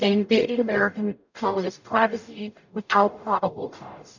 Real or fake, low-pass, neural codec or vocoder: fake; 7.2 kHz; codec, 44.1 kHz, 0.9 kbps, DAC